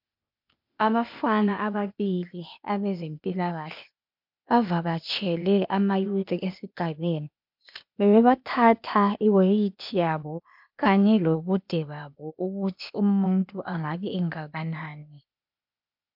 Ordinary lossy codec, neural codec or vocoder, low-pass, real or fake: MP3, 48 kbps; codec, 16 kHz, 0.8 kbps, ZipCodec; 5.4 kHz; fake